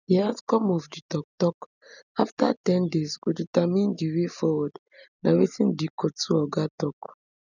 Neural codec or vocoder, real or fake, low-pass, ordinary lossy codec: none; real; 7.2 kHz; none